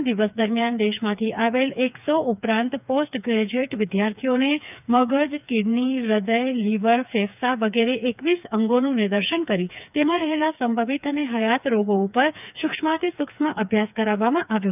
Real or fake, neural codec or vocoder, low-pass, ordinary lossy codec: fake; codec, 16 kHz, 4 kbps, FreqCodec, smaller model; 3.6 kHz; none